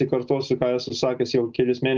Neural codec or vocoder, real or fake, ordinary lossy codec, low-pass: none; real; Opus, 32 kbps; 7.2 kHz